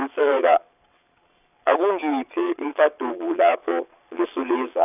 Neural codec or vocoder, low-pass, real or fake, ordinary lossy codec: vocoder, 22.05 kHz, 80 mel bands, Vocos; 3.6 kHz; fake; none